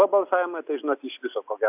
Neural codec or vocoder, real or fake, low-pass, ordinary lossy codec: none; real; 3.6 kHz; AAC, 32 kbps